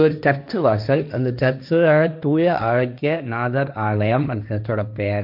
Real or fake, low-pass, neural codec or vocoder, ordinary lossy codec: fake; 5.4 kHz; codec, 16 kHz, 1.1 kbps, Voila-Tokenizer; none